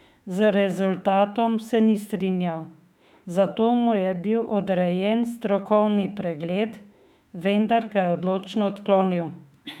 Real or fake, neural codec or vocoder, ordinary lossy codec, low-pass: fake; autoencoder, 48 kHz, 32 numbers a frame, DAC-VAE, trained on Japanese speech; none; 19.8 kHz